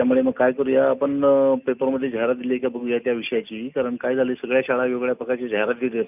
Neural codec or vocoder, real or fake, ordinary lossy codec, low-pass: none; real; none; 3.6 kHz